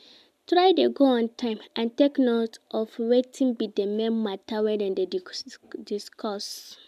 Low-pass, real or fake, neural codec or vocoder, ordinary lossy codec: 14.4 kHz; real; none; MP3, 96 kbps